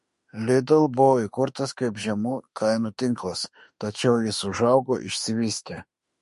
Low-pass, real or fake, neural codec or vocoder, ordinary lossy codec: 14.4 kHz; fake; autoencoder, 48 kHz, 32 numbers a frame, DAC-VAE, trained on Japanese speech; MP3, 48 kbps